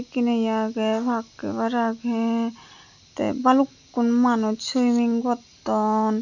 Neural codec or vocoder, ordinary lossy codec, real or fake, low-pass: none; none; real; 7.2 kHz